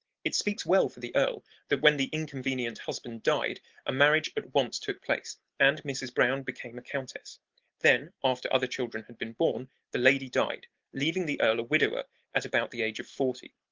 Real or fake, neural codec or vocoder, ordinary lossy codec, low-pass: real; none; Opus, 16 kbps; 7.2 kHz